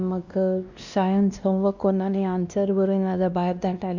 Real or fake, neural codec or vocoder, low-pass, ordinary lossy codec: fake; codec, 16 kHz, 1 kbps, X-Codec, WavLM features, trained on Multilingual LibriSpeech; 7.2 kHz; none